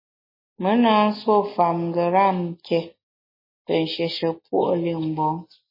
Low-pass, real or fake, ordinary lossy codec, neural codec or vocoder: 5.4 kHz; real; MP3, 24 kbps; none